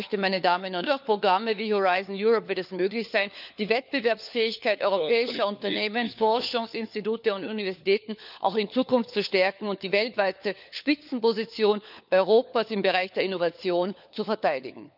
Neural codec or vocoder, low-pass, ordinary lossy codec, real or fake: codec, 16 kHz, 4 kbps, FunCodec, trained on LibriTTS, 50 frames a second; 5.4 kHz; none; fake